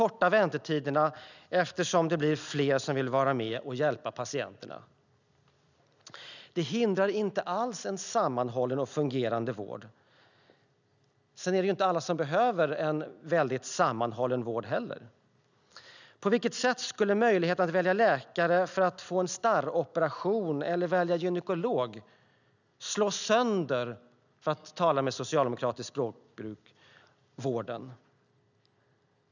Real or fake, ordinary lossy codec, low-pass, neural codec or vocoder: real; none; 7.2 kHz; none